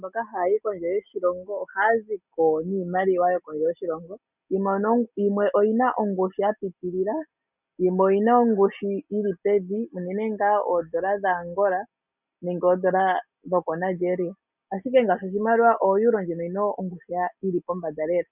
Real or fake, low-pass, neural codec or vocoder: real; 3.6 kHz; none